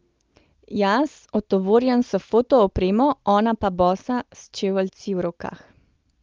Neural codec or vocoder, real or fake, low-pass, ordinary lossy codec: none; real; 7.2 kHz; Opus, 32 kbps